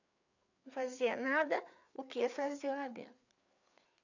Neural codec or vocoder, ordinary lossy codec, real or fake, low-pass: codec, 16 kHz, 4 kbps, FreqCodec, larger model; none; fake; 7.2 kHz